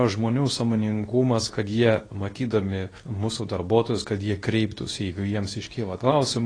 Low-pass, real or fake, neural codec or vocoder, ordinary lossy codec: 9.9 kHz; fake; codec, 24 kHz, 0.9 kbps, WavTokenizer, medium speech release version 2; AAC, 32 kbps